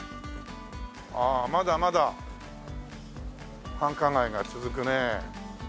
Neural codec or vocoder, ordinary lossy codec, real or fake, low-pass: none; none; real; none